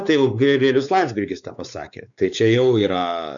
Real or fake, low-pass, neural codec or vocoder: fake; 7.2 kHz; codec, 16 kHz, 4 kbps, X-Codec, WavLM features, trained on Multilingual LibriSpeech